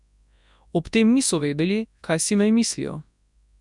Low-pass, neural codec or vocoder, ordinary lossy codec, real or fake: 10.8 kHz; codec, 24 kHz, 0.9 kbps, WavTokenizer, large speech release; none; fake